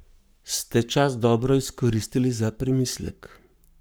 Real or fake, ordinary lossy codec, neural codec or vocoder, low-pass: fake; none; codec, 44.1 kHz, 7.8 kbps, Pupu-Codec; none